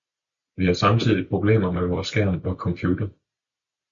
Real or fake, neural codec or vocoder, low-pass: real; none; 7.2 kHz